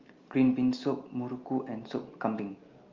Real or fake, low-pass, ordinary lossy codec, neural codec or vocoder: real; 7.2 kHz; Opus, 32 kbps; none